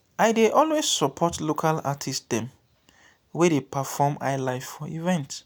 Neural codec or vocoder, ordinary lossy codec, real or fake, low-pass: none; none; real; none